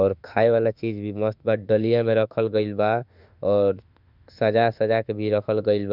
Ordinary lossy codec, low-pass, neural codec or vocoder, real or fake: none; 5.4 kHz; autoencoder, 48 kHz, 32 numbers a frame, DAC-VAE, trained on Japanese speech; fake